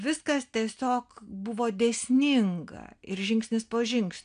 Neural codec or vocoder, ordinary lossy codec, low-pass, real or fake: none; AAC, 64 kbps; 9.9 kHz; real